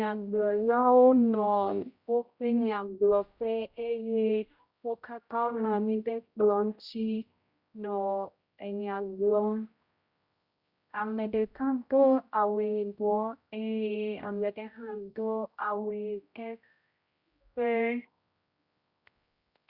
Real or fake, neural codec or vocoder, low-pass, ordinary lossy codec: fake; codec, 16 kHz, 0.5 kbps, X-Codec, HuBERT features, trained on general audio; 5.4 kHz; Opus, 64 kbps